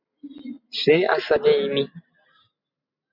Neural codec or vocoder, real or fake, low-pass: none; real; 5.4 kHz